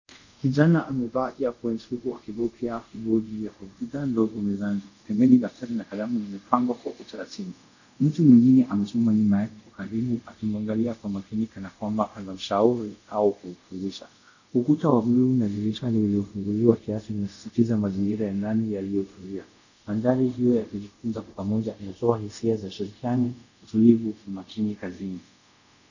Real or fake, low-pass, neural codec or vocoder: fake; 7.2 kHz; codec, 24 kHz, 0.5 kbps, DualCodec